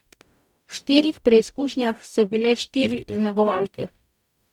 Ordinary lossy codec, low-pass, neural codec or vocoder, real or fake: none; 19.8 kHz; codec, 44.1 kHz, 0.9 kbps, DAC; fake